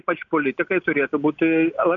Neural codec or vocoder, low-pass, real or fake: none; 7.2 kHz; real